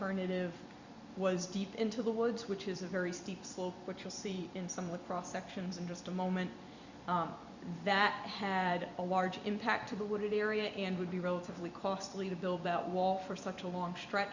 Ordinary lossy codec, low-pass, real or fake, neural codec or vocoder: Opus, 64 kbps; 7.2 kHz; fake; vocoder, 44.1 kHz, 128 mel bands every 256 samples, BigVGAN v2